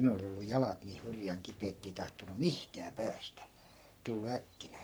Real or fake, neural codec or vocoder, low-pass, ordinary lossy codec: fake; codec, 44.1 kHz, 3.4 kbps, Pupu-Codec; none; none